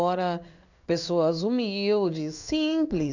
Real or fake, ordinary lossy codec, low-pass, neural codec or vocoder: fake; none; 7.2 kHz; codec, 16 kHz in and 24 kHz out, 1 kbps, XY-Tokenizer